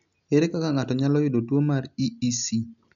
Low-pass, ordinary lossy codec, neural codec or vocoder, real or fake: 7.2 kHz; none; none; real